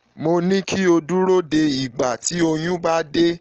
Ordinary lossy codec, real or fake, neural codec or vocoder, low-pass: Opus, 16 kbps; real; none; 7.2 kHz